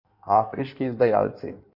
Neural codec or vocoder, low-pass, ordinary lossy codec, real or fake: codec, 16 kHz in and 24 kHz out, 1.1 kbps, FireRedTTS-2 codec; 5.4 kHz; none; fake